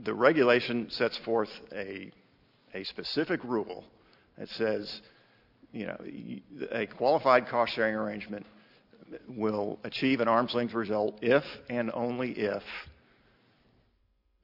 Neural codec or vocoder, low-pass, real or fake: none; 5.4 kHz; real